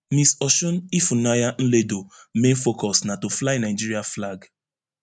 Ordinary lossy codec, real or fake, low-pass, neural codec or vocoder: none; real; 9.9 kHz; none